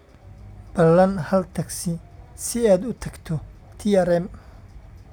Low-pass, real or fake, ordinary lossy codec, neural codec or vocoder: none; real; none; none